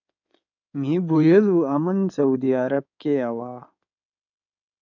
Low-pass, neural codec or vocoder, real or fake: 7.2 kHz; codec, 16 kHz in and 24 kHz out, 2.2 kbps, FireRedTTS-2 codec; fake